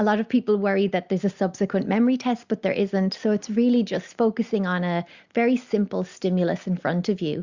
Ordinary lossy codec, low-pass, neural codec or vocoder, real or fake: Opus, 64 kbps; 7.2 kHz; none; real